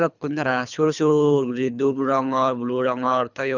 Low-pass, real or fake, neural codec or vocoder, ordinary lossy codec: 7.2 kHz; fake; codec, 24 kHz, 3 kbps, HILCodec; none